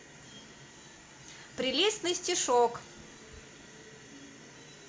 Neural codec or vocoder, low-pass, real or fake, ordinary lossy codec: none; none; real; none